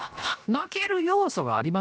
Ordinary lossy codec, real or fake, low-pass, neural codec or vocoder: none; fake; none; codec, 16 kHz, 0.7 kbps, FocalCodec